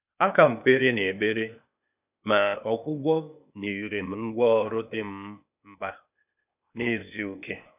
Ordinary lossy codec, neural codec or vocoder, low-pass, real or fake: none; codec, 16 kHz, 0.8 kbps, ZipCodec; 3.6 kHz; fake